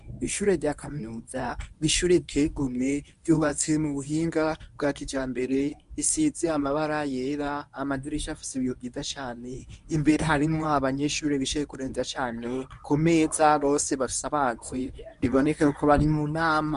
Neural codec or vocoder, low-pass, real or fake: codec, 24 kHz, 0.9 kbps, WavTokenizer, medium speech release version 1; 10.8 kHz; fake